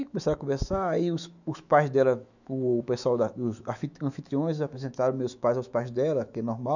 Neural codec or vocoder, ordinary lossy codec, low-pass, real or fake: autoencoder, 48 kHz, 128 numbers a frame, DAC-VAE, trained on Japanese speech; none; 7.2 kHz; fake